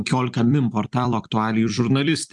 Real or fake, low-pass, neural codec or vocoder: fake; 10.8 kHz; vocoder, 44.1 kHz, 128 mel bands every 256 samples, BigVGAN v2